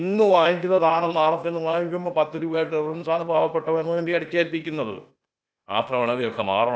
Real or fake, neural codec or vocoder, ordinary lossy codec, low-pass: fake; codec, 16 kHz, 0.8 kbps, ZipCodec; none; none